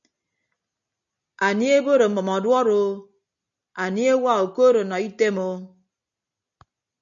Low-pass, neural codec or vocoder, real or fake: 7.2 kHz; none; real